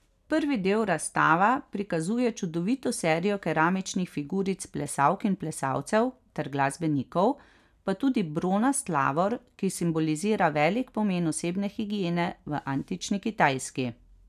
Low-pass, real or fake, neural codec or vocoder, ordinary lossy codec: 14.4 kHz; real; none; none